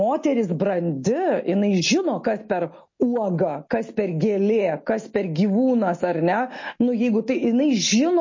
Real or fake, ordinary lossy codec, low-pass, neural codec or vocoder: real; MP3, 32 kbps; 7.2 kHz; none